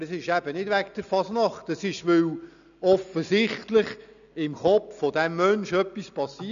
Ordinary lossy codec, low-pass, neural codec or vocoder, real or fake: none; 7.2 kHz; none; real